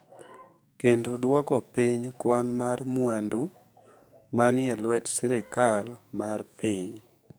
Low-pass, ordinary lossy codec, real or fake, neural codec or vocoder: none; none; fake; codec, 44.1 kHz, 2.6 kbps, SNAC